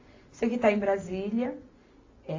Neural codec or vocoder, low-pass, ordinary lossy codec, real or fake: none; 7.2 kHz; AAC, 32 kbps; real